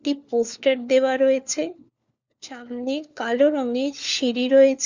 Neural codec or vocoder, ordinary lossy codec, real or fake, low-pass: codec, 16 kHz, 4 kbps, FunCodec, trained on LibriTTS, 50 frames a second; Opus, 64 kbps; fake; 7.2 kHz